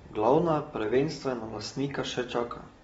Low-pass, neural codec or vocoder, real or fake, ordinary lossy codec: 19.8 kHz; none; real; AAC, 24 kbps